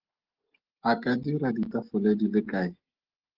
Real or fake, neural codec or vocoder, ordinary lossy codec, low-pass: real; none; Opus, 16 kbps; 5.4 kHz